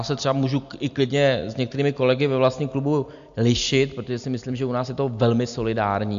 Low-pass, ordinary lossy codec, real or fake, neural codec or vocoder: 7.2 kHz; AAC, 96 kbps; real; none